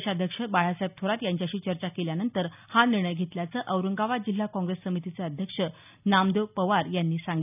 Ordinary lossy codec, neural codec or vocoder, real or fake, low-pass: none; none; real; 3.6 kHz